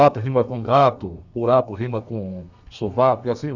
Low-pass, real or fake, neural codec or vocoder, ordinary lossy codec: 7.2 kHz; fake; codec, 32 kHz, 1.9 kbps, SNAC; none